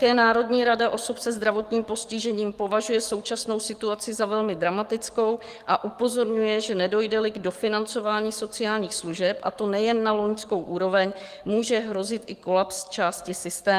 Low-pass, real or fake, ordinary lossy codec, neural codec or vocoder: 14.4 kHz; fake; Opus, 24 kbps; codec, 44.1 kHz, 7.8 kbps, DAC